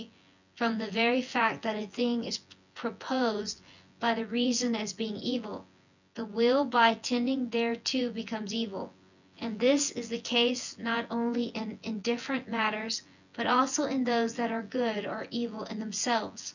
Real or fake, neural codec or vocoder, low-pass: fake; vocoder, 24 kHz, 100 mel bands, Vocos; 7.2 kHz